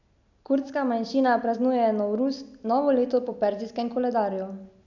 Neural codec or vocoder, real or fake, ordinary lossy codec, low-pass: none; real; none; 7.2 kHz